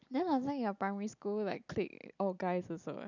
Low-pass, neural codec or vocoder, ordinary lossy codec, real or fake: 7.2 kHz; none; none; real